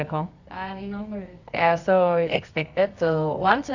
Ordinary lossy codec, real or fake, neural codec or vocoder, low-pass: Opus, 64 kbps; fake; codec, 24 kHz, 0.9 kbps, WavTokenizer, medium music audio release; 7.2 kHz